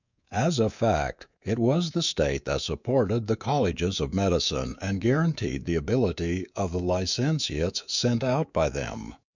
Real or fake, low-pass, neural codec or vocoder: fake; 7.2 kHz; codec, 24 kHz, 3.1 kbps, DualCodec